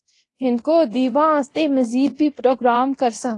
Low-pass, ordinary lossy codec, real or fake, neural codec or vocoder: 10.8 kHz; AAC, 48 kbps; fake; codec, 24 kHz, 0.9 kbps, DualCodec